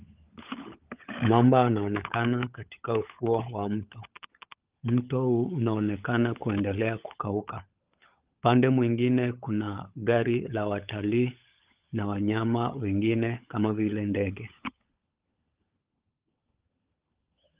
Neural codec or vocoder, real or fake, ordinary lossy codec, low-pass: codec, 16 kHz, 16 kbps, FunCodec, trained on LibriTTS, 50 frames a second; fake; Opus, 24 kbps; 3.6 kHz